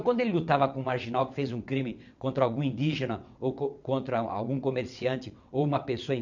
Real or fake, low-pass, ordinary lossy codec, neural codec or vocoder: fake; 7.2 kHz; none; vocoder, 22.05 kHz, 80 mel bands, WaveNeXt